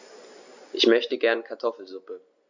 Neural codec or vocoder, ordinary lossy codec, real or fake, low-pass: none; Opus, 64 kbps; real; 7.2 kHz